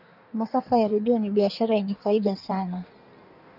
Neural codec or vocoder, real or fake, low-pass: codec, 16 kHz in and 24 kHz out, 1.1 kbps, FireRedTTS-2 codec; fake; 5.4 kHz